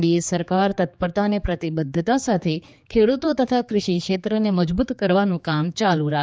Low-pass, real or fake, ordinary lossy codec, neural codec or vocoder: none; fake; none; codec, 16 kHz, 4 kbps, X-Codec, HuBERT features, trained on general audio